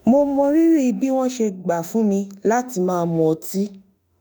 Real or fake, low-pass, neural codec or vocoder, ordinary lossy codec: fake; none; autoencoder, 48 kHz, 32 numbers a frame, DAC-VAE, trained on Japanese speech; none